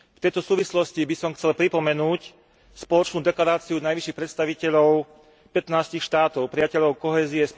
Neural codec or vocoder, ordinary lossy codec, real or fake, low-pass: none; none; real; none